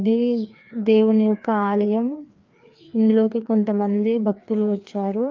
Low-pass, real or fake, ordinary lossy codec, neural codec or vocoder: 7.2 kHz; fake; Opus, 24 kbps; codec, 16 kHz, 2 kbps, FreqCodec, larger model